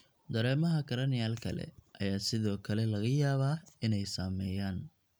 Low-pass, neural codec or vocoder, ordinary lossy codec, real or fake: none; none; none; real